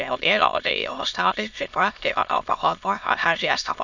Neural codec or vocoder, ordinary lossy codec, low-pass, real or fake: autoencoder, 22.05 kHz, a latent of 192 numbers a frame, VITS, trained on many speakers; none; 7.2 kHz; fake